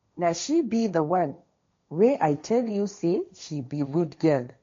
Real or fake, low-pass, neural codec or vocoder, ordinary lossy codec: fake; 7.2 kHz; codec, 16 kHz, 1.1 kbps, Voila-Tokenizer; MP3, 48 kbps